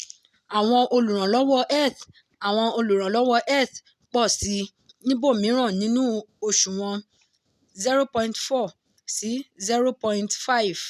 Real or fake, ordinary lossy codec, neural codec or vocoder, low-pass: real; none; none; 14.4 kHz